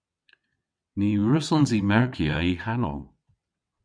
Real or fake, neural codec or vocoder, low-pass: fake; vocoder, 22.05 kHz, 80 mel bands, WaveNeXt; 9.9 kHz